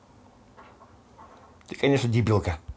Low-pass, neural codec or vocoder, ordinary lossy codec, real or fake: none; none; none; real